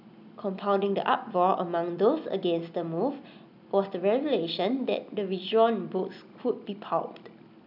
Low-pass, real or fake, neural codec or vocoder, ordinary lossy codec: 5.4 kHz; real; none; none